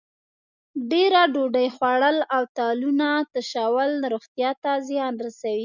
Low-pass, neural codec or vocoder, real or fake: 7.2 kHz; none; real